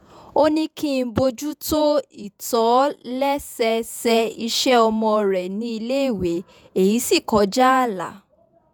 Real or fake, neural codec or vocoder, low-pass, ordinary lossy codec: fake; vocoder, 48 kHz, 128 mel bands, Vocos; none; none